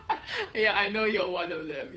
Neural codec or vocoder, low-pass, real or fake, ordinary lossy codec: codec, 16 kHz, 2 kbps, FunCodec, trained on Chinese and English, 25 frames a second; none; fake; none